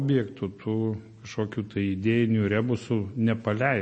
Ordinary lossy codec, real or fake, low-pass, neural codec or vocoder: MP3, 32 kbps; real; 9.9 kHz; none